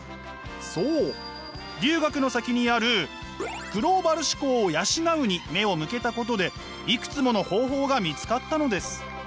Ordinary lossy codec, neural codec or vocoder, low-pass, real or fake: none; none; none; real